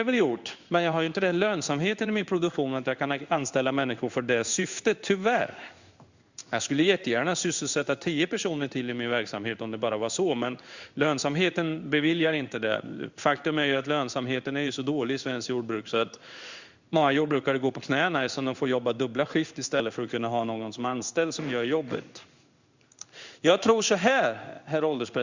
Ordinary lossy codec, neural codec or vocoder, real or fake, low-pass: Opus, 64 kbps; codec, 16 kHz in and 24 kHz out, 1 kbps, XY-Tokenizer; fake; 7.2 kHz